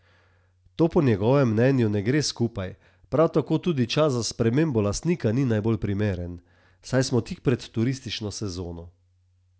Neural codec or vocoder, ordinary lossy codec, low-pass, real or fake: none; none; none; real